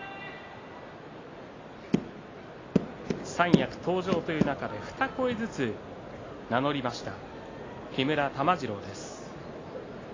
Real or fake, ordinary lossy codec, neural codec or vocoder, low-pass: real; AAC, 32 kbps; none; 7.2 kHz